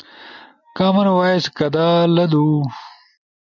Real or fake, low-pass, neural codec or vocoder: real; 7.2 kHz; none